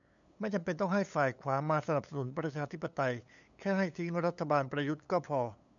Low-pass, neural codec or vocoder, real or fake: 7.2 kHz; codec, 16 kHz, 8 kbps, FunCodec, trained on LibriTTS, 25 frames a second; fake